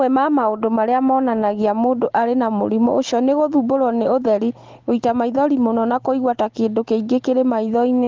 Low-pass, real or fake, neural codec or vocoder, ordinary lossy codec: 7.2 kHz; real; none; Opus, 16 kbps